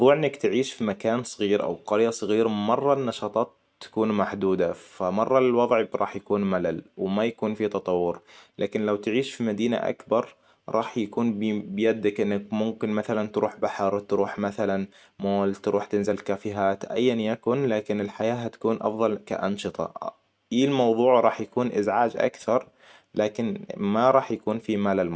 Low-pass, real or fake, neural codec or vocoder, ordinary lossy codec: none; real; none; none